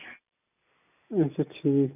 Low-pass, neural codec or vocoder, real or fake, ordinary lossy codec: 3.6 kHz; none; real; none